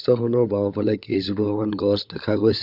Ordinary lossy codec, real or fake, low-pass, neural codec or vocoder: none; fake; 5.4 kHz; codec, 16 kHz, 16 kbps, FunCodec, trained on LibriTTS, 50 frames a second